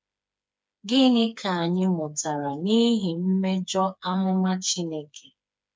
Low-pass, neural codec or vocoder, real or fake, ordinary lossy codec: none; codec, 16 kHz, 4 kbps, FreqCodec, smaller model; fake; none